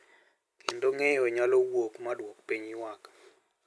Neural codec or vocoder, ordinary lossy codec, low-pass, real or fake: none; none; none; real